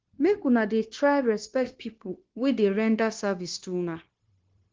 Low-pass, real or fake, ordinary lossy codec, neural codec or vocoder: 7.2 kHz; fake; Opus, 16 kbps; codec, 16 kHz, 0.9 kbps, LongCat-Audio-Codec